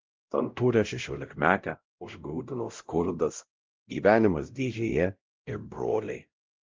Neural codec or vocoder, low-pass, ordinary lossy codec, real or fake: codec, 16 kHz, 0.5 kbps, X-Codec, WavLM features, trained on Multilingual LibriSpeech; 7.2 kHz; Opus, 32 kbps; fake